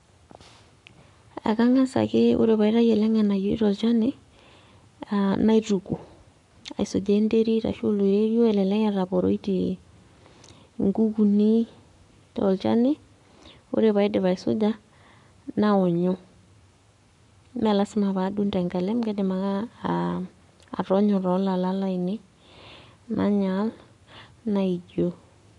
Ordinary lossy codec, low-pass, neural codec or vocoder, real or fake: none; 10.8 kHz; codec, 44.1 kHz, 7.8 kbps, Pupu-Codec; fake